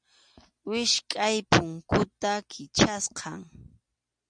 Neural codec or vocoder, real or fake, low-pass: none; real; 9.9 kHz